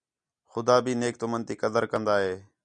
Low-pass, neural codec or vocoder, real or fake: 9.9 kHz; none; real